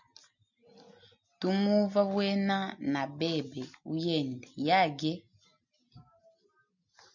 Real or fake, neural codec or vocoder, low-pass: real; none; 7.2 kHz